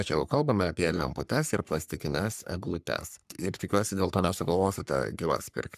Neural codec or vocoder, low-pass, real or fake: codec, 44.1 kHz, 3.4 kbps, Pupu-Codec; 14.4 kHz; fake